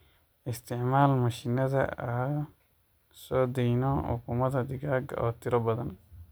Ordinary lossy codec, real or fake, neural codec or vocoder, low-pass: none; real; none; none